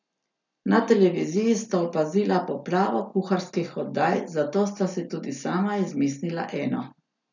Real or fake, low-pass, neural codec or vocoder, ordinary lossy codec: real; 7.2 kHz; none; none